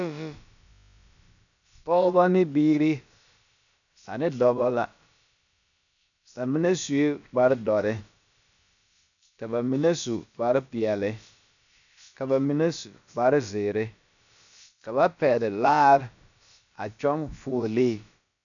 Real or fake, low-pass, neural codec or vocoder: fake; 7.2 kHz; codec, 16 kHz, about 1 kbps, DyCAST, with the encoder's durations